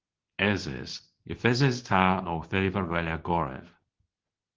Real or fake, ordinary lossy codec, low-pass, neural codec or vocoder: fake; Opus, 16 kbps; 7.2 kHz; codec, 24 kHz, 0.9 kbps, WavTokenizer, medium speech release version 2